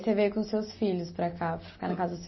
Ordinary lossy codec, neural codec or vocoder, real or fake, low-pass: MP3, 24 kbps; none; real; 7.2 kHz